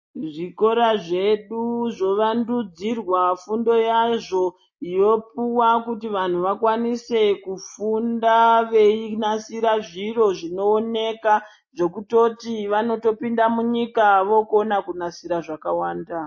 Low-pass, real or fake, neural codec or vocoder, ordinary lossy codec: 7.2 kHz; real; none; MP3, 32 kbps